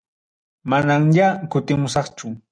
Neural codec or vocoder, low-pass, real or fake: none; 9.9 kHz; real